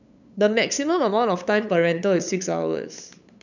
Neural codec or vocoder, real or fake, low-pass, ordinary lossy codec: codec, 16 kHz, 8 kbps, FunCodec, trained on LibriTTS, 25 frames a second; fake; 7.2 kHz; none